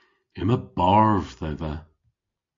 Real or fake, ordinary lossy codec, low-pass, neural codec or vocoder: real; AAC, 48 kbps; 7.2 kHz; none